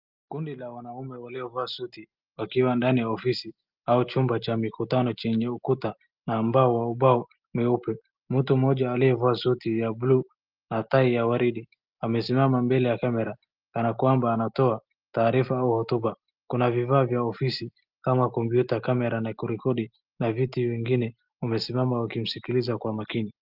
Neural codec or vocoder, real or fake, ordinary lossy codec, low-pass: none; real; Opus, 24 kbps; 5.4 kHz